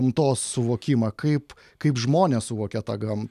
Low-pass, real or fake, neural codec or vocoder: 14.4 kHz; real; none